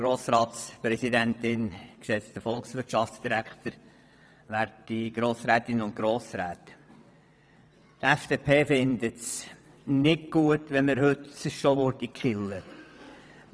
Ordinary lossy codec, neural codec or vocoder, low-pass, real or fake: none; vocoder, 22.05 kHz, 80 mel bands, WaveNeXt; none; fake